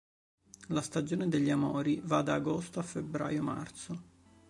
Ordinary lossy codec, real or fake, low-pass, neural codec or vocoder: MP3, 64 kbps; real; 10.8 kHz; none